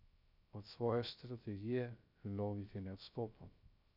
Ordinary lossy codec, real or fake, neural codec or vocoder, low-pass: none; fake; codec, 16 kHz, 0.3 kbps, FocalCodec; 5.4 kHz